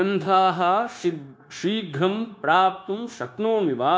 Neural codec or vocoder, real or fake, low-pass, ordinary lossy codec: codec, 16 kHz, 0.9 kbps, LongCat-Audio-Codec; fake; none; none